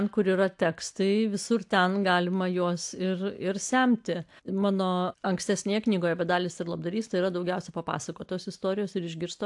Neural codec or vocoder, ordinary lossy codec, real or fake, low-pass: none; AAC, 64 kbps; real; 10.8 kHz